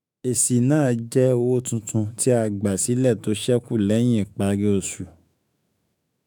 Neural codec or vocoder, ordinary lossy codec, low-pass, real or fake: autoencoder, 48 kHz, 128 numbers a frame, DAC-VAE, trained on Japanese speech; none; none; fake